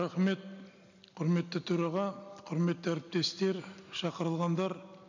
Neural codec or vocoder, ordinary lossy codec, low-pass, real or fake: none; none; 7.2 kHz; real